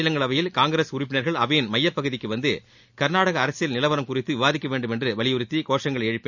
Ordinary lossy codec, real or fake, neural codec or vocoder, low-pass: none; real; none; 7.2 kHz